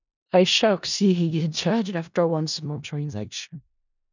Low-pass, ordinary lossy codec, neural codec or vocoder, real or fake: 7.2 kHz; none; codec, 16 kHz in and 24 kHz out, 0.4 kbps, LongCat-Audio-Codec, four codebook decoder; fake